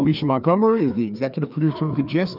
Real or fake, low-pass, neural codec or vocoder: fake; 5.4 kHz; codec, 16 kHz, 2 kbps, FreqCodec, larger model